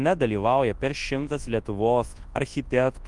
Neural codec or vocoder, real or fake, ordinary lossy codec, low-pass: codec, 24 kHz, 0.9 kbps, WavTokenizer, large speech release; fake; Opus, 24 kbps; 10.8 kHz